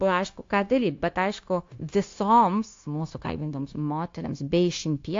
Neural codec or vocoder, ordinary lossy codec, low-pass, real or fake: codec, 16 kHz, 0.9 kbps, LongCat-Audio-Codec; AAC, 48 kbps; 7.2 kHz; fake